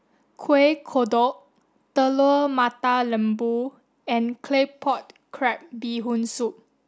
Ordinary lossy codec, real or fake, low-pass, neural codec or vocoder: none; real; none; none